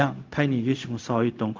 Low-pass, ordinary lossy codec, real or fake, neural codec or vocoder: 7.2 kHz; Opus, 32 kbps; fake; codec, 16 kHz, 0.4 kbps, LongCat-Audio-Codec